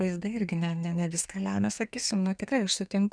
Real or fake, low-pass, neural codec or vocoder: fake; 9.9 kHz; codec, 32 kHz, 1.9 kbps, SNAC